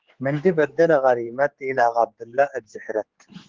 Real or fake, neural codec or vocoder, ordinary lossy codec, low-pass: fake; codec, 16 kHz, 4 kbps, X-Codec, HuBERT features, trained on general audio; Opus, 16 kbps; 7.2 kHz